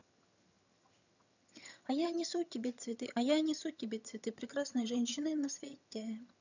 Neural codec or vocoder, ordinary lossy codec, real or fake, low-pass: vocoder, 22.05 kHz, 80 mel bands, HiFi-GAN; none; fake; 7.2 kHz